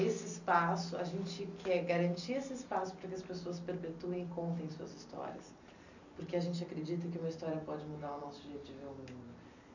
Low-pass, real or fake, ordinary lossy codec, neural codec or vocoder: 7.2 kHz; real; none; none